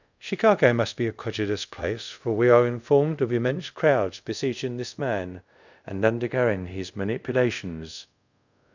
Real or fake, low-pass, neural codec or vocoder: fake; 7.2 kHz; codec, 24 kHz, 0.5 kbps, DualCodec